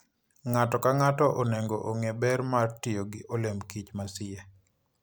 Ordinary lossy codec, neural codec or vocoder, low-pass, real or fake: none; none; none; real